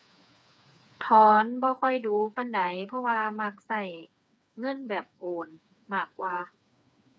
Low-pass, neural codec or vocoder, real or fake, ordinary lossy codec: none; codec, 16 kHz, 4 kbps, FreqCodec, smaller model; fake; none